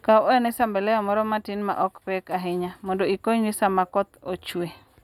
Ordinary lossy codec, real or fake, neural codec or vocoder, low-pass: none; real; none; 19.8 kHz